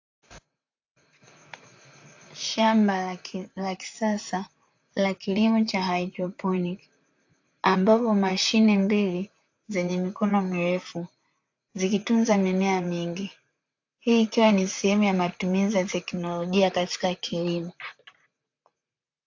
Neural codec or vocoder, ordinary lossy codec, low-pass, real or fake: vocoder, 44.1 kHz, 128 mel bands, Pupu-Vocoder; AAC, 48 kbps; 7.2 kHz; fake